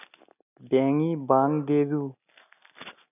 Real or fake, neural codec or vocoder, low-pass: real; none; 3.6 kHz